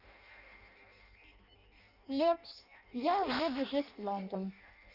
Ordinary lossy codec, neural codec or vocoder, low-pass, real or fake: none; codec, 16 kHz in and 24 kHz out, 0.6 kbps, FireRedTTS-2 codec; 5.4 kHz; fake